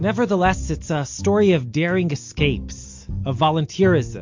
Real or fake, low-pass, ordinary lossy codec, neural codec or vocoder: real; 7.2 kHz; MP3, 48 kbps; none